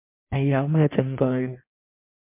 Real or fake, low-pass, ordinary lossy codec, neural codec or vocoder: fake; 3.6 kHz; MP3, 24 kbps; codec, 16 kHz in and 24 kHz out, 1.1 kbps, FireRedTTS-2 codec